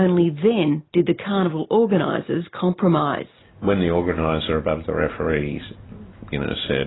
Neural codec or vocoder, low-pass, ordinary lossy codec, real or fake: none; 7.2 kHz; AAC, 16 kbps; real